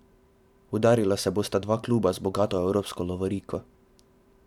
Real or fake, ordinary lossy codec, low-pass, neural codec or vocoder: fake; none; 19.8 kHz; vocoder, 44.1 kHz, 128 mel bands every 512 samples, BigVGAN v2